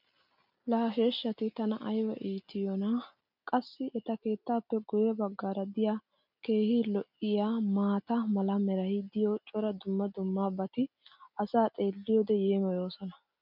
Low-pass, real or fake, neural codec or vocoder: 5.4 kHz; real; none